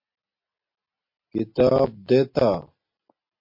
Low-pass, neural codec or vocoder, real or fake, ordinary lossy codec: 5.4 kHz; none; real; MP3, 32 kbps